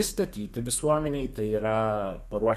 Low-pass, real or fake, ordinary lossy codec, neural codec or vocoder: 14.4 kHz; fake; Opus, 64 kbps; codec, 32 kHz, 1.9 kbps, SNAC